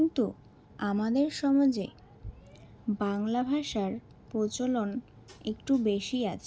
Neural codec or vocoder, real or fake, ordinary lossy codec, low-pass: none; real; none; none